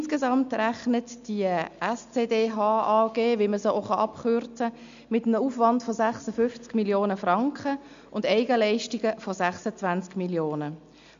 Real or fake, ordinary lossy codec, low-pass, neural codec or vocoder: real; MP3, 64 kbps; 7.2 kHz; none